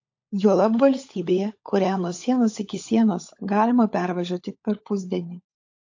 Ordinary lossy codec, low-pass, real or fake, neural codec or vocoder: AAC, 48 kbps; 7.2 kHz; fake; codec, 16 kHz, 16 kbps, FunCodec, trained on LibriTTS, 50 frames a second